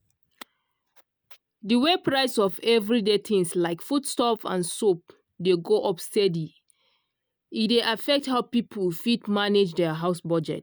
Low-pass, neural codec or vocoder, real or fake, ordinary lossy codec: none; none; real; none